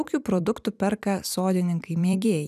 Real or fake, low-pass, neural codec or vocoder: fake; 14.4 kHz; vocoder, 44.1 kHz, 128 mel bands every 256 samples, BigVGAN v2